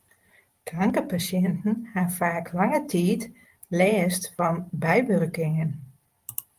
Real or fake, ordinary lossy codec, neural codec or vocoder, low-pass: fake; Opus, 32 kbps; vocoder, 44.1 kHz, 128 mel bands every 512 samples, BigVGAN v2; 14.4 kHz